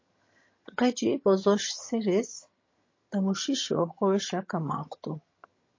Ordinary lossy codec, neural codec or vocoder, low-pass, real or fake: MP3, 32 kbps; vocoder, 22.05 kHz, 80 mel bands, HiFi-GAN; 7.2 kHz; fake